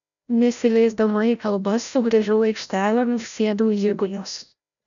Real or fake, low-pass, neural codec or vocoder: fake; 7.2 kHz; codec, 16 kHz, 0.5 kbps, FreqCodec, larger model